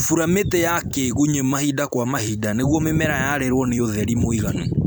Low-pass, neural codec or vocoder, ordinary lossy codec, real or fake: none; vocoder, 44.1 kHz, 128 mel bands every 256 samples, BigVGAN v2; none; fake